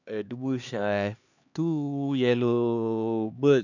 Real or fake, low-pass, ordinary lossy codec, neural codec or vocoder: fake; 7.2 kHz; none; codec, 16 kHz, 2 kbps, X-Codec, HuBERT features, trained on LibriSpeech